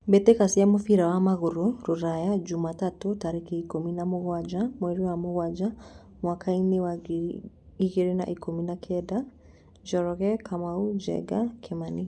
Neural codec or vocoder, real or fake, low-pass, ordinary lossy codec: none; real; none; none